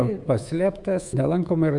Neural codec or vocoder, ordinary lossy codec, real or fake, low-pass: codec, 24 kHz, 3.1 kbps, DualCodec; Opus, 64 kbps; fake; 10.8 kHz